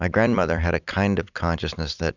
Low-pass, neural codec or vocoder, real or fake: 7.2 kHz; vocoder, 44.1 kHz, 128 mel bands every 256 samples, BigVGAN v2; fake